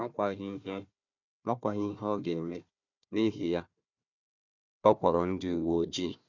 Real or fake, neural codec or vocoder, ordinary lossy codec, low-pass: fake; codec, 16 kHz, 1 kbps, FunCodec, trained on Chinese and English, 50 frames a second; none; 7.2 kHz